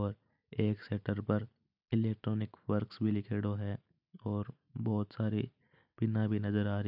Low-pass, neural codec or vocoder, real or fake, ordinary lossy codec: 5.4 kHz; none; real; none